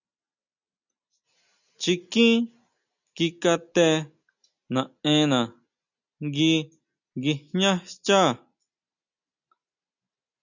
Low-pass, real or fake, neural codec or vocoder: 7.2 kHz; real; none